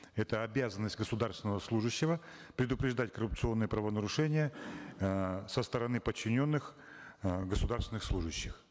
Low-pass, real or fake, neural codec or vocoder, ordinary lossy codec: none; real; none; none